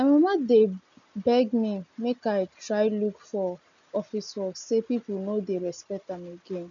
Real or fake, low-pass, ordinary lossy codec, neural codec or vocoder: real; 7.2 kHz; none; none